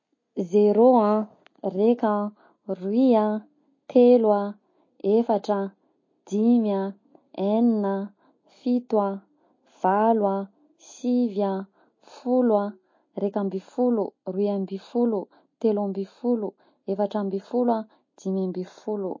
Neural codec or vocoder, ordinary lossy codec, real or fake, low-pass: none; MP3, 32 kbps; real; 7.2 kHz